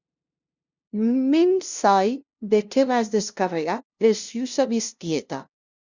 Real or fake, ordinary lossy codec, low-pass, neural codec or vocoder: fake; Opus, 64 kbps; 7.2 kHz; codec, 16 kHz, 0.5 kbps, FunCodec, trained on LibriTTS, 25 frames a second